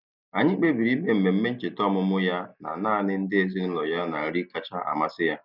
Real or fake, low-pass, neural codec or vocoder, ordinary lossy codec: real; 5.4 kHz; none; none